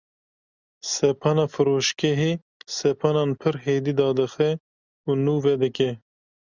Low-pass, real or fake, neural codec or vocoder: 7.2 kHz; real; none